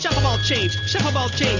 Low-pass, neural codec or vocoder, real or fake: 7.2 kHz; none; real